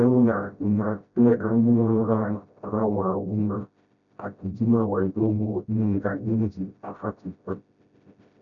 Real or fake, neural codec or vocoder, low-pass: fake; codec, 16 kHz, 0.5 kbps, FreqCodec, smaller model; 7.2 kHz